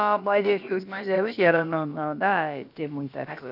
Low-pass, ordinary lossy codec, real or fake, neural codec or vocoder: 5.4 kHz; none; fake; codec, 16 kHz, about 1 kbps, DyCAST, with the encoder's durations